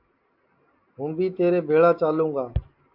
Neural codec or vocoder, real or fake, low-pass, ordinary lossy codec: none; real; 5.4 kHz; MP3, 48 kbps